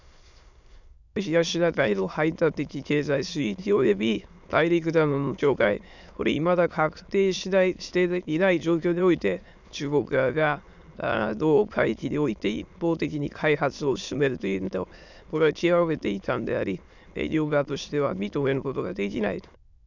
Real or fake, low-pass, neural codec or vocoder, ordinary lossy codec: fake; 7.2 kHz; autoencoder, 22.05 kHz, a latent of 192 numbers a frame, VITS, trained on many speakers; none